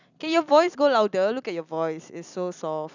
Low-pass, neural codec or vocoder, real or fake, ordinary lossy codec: 7.2 kHz; vocoder, 44.1 kHz, 128 mel bands every 256 samples, BigVGAN v2; fake; none